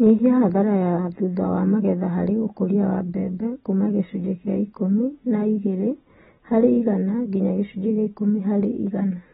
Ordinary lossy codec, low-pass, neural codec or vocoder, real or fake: AAC, 16 kbps; 19.8 kHz; none; real